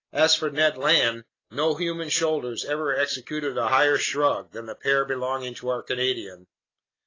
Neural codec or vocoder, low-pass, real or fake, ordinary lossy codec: none; 7.2 kHz; real; AAC, 32 kbps